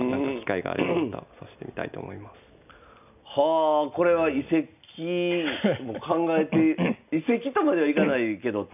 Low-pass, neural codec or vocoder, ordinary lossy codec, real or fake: 3.6 kHz; none; none; real